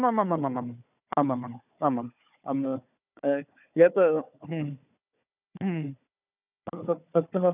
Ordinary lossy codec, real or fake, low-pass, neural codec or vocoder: none; fake; 3.6 kHz; codec, 16 kHz, 4 kbps, FunCodec, trained on Chinese and English, 50 frames a second